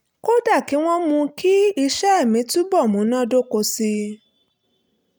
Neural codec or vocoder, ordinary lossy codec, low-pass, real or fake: none; none; none; real